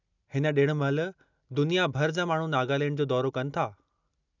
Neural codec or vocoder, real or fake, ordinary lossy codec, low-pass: none; real; none; 7.2 kHz